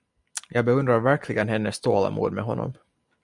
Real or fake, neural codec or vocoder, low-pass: real; none; 10.8 kHz